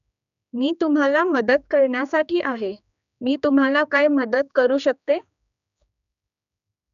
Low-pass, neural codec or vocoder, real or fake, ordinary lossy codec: 7.2 kHz; codec, 16 kHz, 2 kbps, X-Codec, HuBERT features, trained on general audio; fake; none